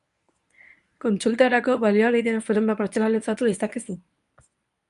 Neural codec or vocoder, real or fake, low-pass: codec, 24 kHz, 0.9 kbps, WavTokenizer, medium speech release version 1; fake; 10.8 kHz